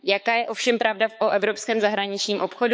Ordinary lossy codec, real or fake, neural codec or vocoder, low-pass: none; fake; codec, 16 kHz, 4 kbps, X-Codec, HuBERT features, trained on balanced general audio; none